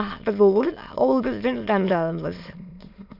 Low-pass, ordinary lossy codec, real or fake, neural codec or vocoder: 5.4 kHz; none; fake; autoencoder, 22.05 kHz, a latent of 192 numbers a frame, VITS, trained on many speakers